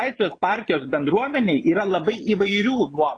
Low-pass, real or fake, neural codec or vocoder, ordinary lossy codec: 9.9 kHz; fake; vocoder, 22.05 kHz, 80 mel bands, Vocos; AAC, 32 kbps